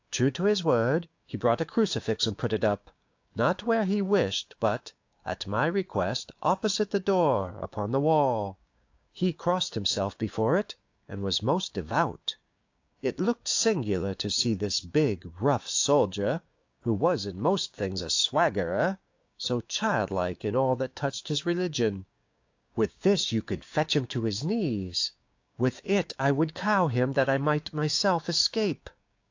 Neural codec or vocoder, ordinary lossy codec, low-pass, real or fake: codec, 16 kHz, 6 kbps, DAC; AAC, 48 kbps; 7.2 kHz; fake